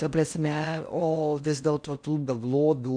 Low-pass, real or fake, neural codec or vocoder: 9.9 kHz; fake; codec, 16 kHz in and 24 kHz out, 0.6 kbps, FocalCodec, streaming, 4096 codes